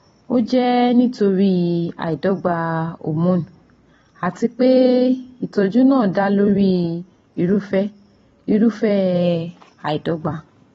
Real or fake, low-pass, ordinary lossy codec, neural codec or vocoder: fake; 19.8 kHz; AAC, 24 kbps; vocoder, 44.1 kHz, 128 mel bands every 256 samples, BigVGAN v2